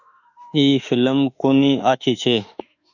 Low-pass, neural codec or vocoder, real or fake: 7.2 kHz; autoencoder, 48 kHz, 32 numbers a frame, DAC-VAE, trained on Japanese speech; fake